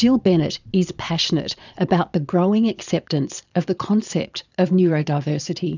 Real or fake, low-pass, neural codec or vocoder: fake; 7.2 kHz; vocoder, 22.05 kHz, 80 mel bands, Vocos